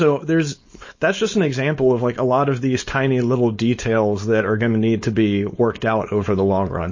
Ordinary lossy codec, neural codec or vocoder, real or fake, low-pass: MP3, 32 kbps; codec, 16 kHz, 4.8 kbps, FACodec; fake; 7.2 kHz